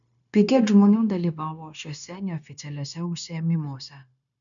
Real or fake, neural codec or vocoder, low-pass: fake; codec, 16 kHz, 0.9 kbps, LongCat-Audio-Codec; 7.2 kHz